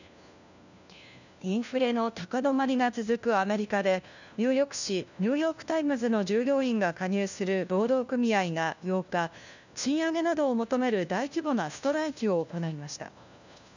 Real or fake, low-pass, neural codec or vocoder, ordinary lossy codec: fake; 7.2 kHz; codec, 16 kHz, 1 kbps, FunCodec, trained on LibriTTS, 50 frames a second; none